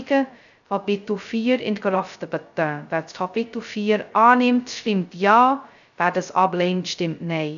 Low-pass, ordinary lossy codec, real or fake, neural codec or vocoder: 7.2 kHz; none; fake; codec, 16 kHz, 0.2 kbps, FocalCodec